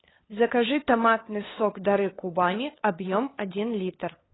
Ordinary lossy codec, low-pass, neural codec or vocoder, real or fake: AAC, 16 kbps; 7.2 kHz; codec, 16 kHz, 2 kbps, FunCodec, trained on LibriTTS, 25 frames a second; fake